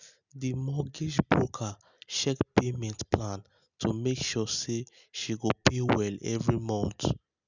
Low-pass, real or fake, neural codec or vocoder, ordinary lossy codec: 7.2 kHz; real; none; none